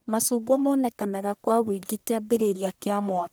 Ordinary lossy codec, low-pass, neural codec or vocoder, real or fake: none; none; codec, 44.1 kHz, 1.7 kbps, Pupu-Codec; fake